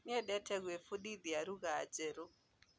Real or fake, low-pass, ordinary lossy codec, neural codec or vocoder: real; none; none; none